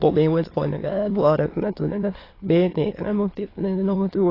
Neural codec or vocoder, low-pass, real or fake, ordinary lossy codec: autoencoder, 22.05 kHz, a latent of 192 numbers a frame, VITS, trained on many speakers; 5.4 kHz; fake; AAC, 24 kbps